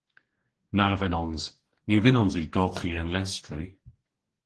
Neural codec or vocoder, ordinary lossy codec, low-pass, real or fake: codec, 44.1 kHz, 2.6 kbps, DAC; Opus, 16 kbps; 10.8 kHz; fake